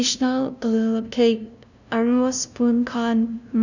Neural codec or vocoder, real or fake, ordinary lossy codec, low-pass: codec, 16 kHz, 0.5 kbps, FunCodec, trained on LibriTTS, 25 frames a second; fake; none; 7.2 kHz